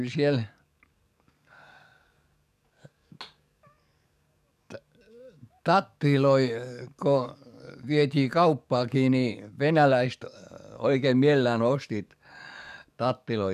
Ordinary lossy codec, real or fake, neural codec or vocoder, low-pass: none; fake; codec, 44.1 kHz, 7.8 kbps, DAC; 14.4 kHz